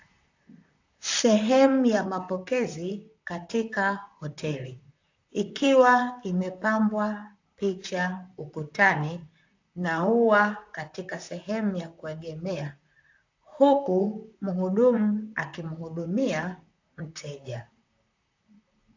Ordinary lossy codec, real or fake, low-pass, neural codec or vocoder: AAC, 48 kbps; fake; 7.2 kHz; vocoder, 44.1 kHz, 128 mel bands, Pupu-Vocoder